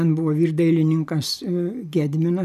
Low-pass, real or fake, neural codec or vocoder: 14.4 kHz; real; none